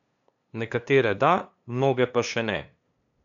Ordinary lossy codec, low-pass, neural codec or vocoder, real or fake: none; 7.2 kHz; codec, 16 kHz, 2 kbps, FunCodec, trained on LibriTTS, 25 frames a second; fake